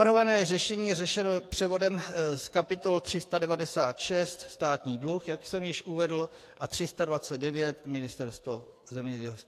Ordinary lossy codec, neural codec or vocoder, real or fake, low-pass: AAC, 64 kbps; codec, 44.1 kHz, 2.6 kbps, SNAC; fake; 14.4 kHz